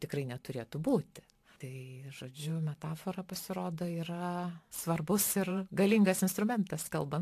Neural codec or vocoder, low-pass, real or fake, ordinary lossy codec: vocoder, 44.1 kHz, 128 mel bands every 512 samples, BigVGAN v2; 14.4 kHz; fake; AAC, 64 kbps